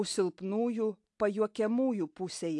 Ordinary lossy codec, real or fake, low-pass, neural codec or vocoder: AAC, 64 kbps; real; 10.8 kHz; none